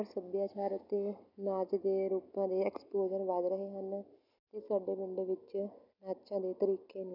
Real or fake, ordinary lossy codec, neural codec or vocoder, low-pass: real; none; none; 5.4 kHz